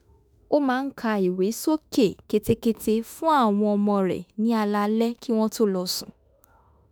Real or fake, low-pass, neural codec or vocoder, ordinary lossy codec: fake; none; autoencoder, 48 kHz, 32 numbers a frame, DAC-VAE, trained on Japanese speech; none